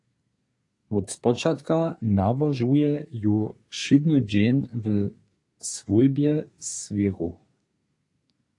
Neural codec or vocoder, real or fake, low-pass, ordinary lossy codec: codec, 24 kHz, 1 kbps, SNAC; fake; 10.8 kHz; AAC, 48 kbps